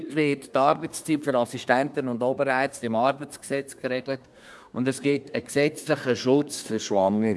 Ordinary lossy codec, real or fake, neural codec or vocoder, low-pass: none; fake; codec, 24 kHz, 1 kbps, SNAC; none